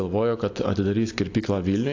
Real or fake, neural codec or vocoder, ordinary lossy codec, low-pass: real; none; AAC, 48 kbps; 7.2 kHz